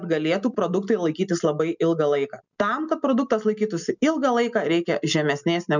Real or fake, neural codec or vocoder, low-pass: real; none; 7.2 kHz